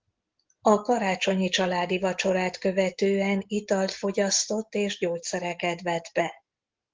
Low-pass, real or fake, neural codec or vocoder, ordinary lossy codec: 7.2 kHz; real; none; Opus, 16 kbps